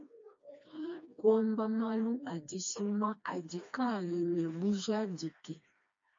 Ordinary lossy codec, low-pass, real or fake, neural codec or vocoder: MP3, 48 kbps; 7.2 kHz; fake; codec, 16 kHz, 2 kbps, FreqCodec, smaller model